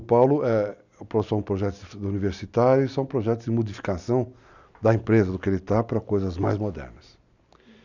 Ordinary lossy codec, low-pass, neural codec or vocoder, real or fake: none; 7.2 kHz; none; real